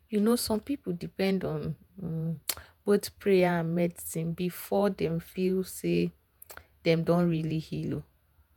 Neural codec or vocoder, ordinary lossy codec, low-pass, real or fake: vocoder, 48 kHz, 128 mel bands, Vocos; none; 19.8 kHz; fake